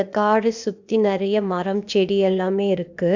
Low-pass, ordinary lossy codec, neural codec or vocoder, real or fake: 7.2 kHz; none; codec, 16 kHz, 0.8 kbps, ZipCodec; fake